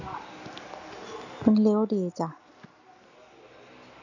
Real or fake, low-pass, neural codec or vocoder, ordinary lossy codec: real; 7.2 kHz; none; none